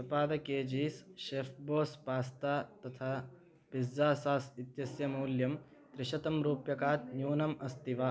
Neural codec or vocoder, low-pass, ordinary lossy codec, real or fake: none; none; none; real